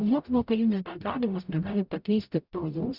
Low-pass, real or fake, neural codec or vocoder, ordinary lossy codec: 5.4 kHz; fake; codec, 44.1 kHz, 0.9 kbps, DAC; Opus, 64 kbps